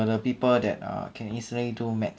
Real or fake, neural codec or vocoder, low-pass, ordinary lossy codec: real; none; none; none